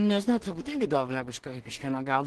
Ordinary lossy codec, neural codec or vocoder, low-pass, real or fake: Opus, 16 kbps; codec, 16 kHz in and 24 kHz out, 0.4 kbps, LongCat-Audio-Codec, two codebook decoder; 10.8 kHz; fake